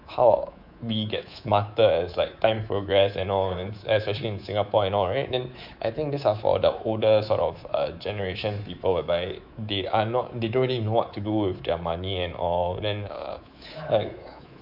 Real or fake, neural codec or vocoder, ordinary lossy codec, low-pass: fake; codec, 24 kHz, 3.1 kbps, DualCodec; none; 5.4 kHz